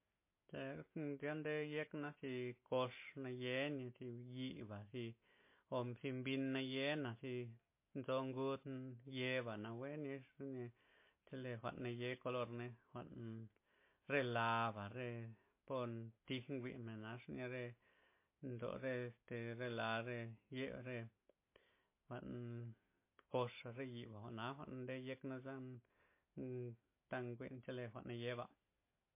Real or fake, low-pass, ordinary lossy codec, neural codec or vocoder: real; 3.6 kHz; MP3, 24 kbps; none